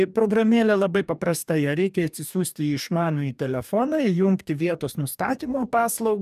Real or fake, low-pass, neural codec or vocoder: fake; 14.4 kHz; codec, 44.1 kHz, 2.6 kbps, DAC